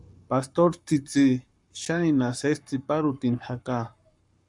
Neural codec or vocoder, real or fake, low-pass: codec, 44.1 kHz, 7.8 kbps, Pupu-Codec; fake; 10.8 kHz